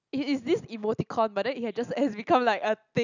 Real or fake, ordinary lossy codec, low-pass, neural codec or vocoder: real; none; 7.2 kHz; none